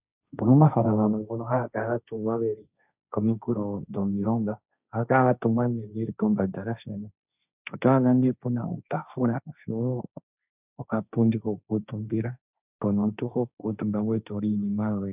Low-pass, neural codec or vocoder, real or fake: 3.6 kHz; codec, 16 kHz, 1.1 kbps, Voila-Tokenizer; fake